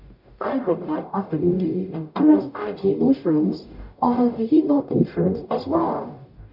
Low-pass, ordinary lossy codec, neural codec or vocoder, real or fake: 5.4 kHz; none; codec, 44.1 kHz, 0.9 kbps, DAC; fake